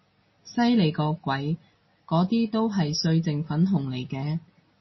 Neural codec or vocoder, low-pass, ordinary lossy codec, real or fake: none; 7.2 kHz; MP3, 24 kbps; real